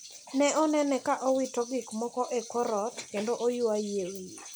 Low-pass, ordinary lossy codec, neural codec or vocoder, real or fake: none; none; none; real